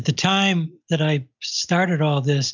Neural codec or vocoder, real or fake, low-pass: none; real; 7.2 kHz